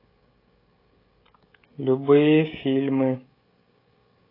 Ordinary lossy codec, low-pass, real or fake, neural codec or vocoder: AAC, 24 kbps; 5.4 kHz; fake; codec, 16 kHz, 16 kbps, FreqCodec, smaller model